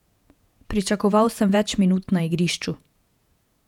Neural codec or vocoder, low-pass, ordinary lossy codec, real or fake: vocoder, 48 kHz, 128 mel bands, Vocos; 19.8 kHz; none; fake